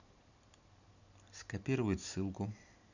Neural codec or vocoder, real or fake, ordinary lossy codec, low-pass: none; real; none; 7.2 kHz